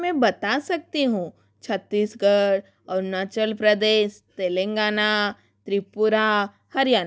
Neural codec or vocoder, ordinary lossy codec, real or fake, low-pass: none; none; real; none